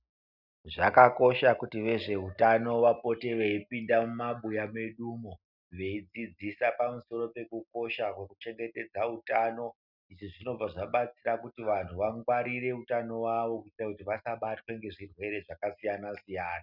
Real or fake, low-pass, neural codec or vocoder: real; 5.4 kHz; none